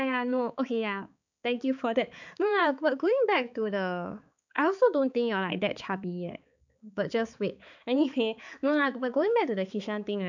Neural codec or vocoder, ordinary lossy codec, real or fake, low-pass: codec, 16 kHz, 4 kbps, X-Codec, HuBERT features, trained on balanced general audio; none; fake; 7.2 kHz